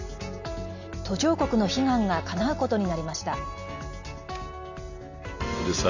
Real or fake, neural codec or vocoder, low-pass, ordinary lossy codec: real; none; 7.2 kHz; none